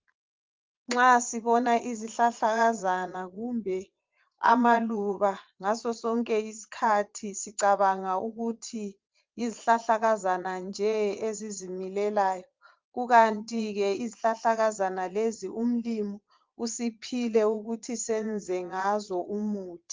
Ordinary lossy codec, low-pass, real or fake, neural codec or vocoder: Opus, 32 kbps; 7.2 kHz; fake; vocoder, 22.05 kHz, 80 mel bands, Vocos